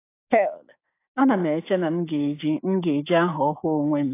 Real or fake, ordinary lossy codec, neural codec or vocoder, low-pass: fake; AAC, 24 kbps; codec, 16 kHz, 6 kbps, DAC; 3.6 kHz